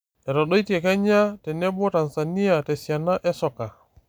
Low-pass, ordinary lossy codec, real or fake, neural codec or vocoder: none; none; real; none